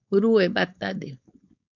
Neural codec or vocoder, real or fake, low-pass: codec, 16 kHz, 4.8 kbps, FACodec; fake; 7.2 kHz